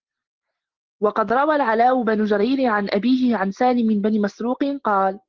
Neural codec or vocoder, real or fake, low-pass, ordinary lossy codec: none; real; 7.2 kHz; Opus, 16 kbps